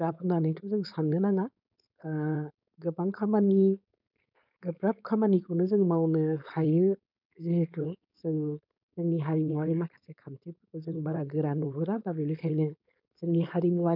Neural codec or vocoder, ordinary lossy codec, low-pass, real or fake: codec, 16 kHz, 4.8 kbps, FACodec; none; 5.4 kHz; fake